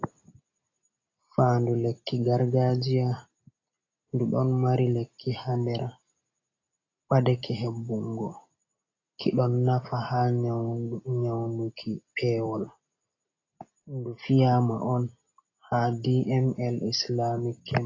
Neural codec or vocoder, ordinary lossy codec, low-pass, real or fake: none; AAC, 32 kbps; 7.2 kHz; real